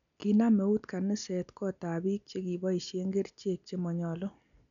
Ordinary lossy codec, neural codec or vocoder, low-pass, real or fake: none; none; 7.2 kHz; real